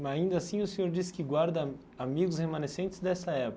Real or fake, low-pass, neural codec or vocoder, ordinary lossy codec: real; none; none; none